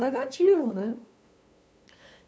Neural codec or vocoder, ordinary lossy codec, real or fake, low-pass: codec, 16 kHz, 2 kbps, FunCodec, trained on LibriTTS, 25 frames a second; none; fake; none